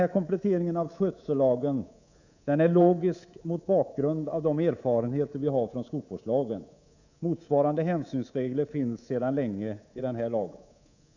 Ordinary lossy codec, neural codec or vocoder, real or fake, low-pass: none; codec, 24 kHz, 3.1 kbps, DualCodec; fake; 7.2 kHz